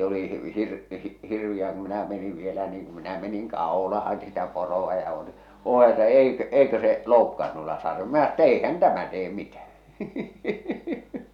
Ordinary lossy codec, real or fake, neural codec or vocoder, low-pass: none; real; none; 19.8 kHz